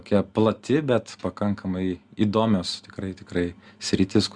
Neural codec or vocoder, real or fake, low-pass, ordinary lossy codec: none; real; 9.9 kHz; Opus, 64 kbps